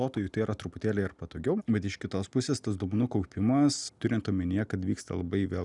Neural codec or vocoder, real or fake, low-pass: none; real; 10.8 kHz